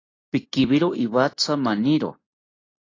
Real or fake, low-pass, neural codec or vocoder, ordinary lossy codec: real; 7.2 kHz; none; AAC, 32 kbps